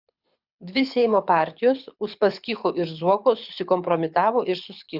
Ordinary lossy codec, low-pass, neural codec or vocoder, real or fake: Opus, 64 kbps; 5.4 kHz; codec, 24 kHz, 6 kbps, HILCodec; fake